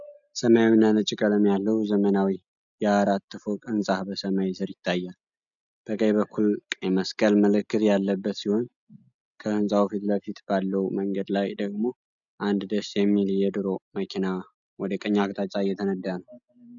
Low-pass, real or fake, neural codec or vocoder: 7.2 kHz; real; none